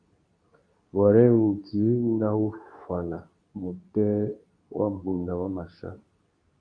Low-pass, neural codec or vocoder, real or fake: 9.9 kHz; codec, 24 kHz, 0.9 kbps, WavTokenizer, medium speech release version 2; fake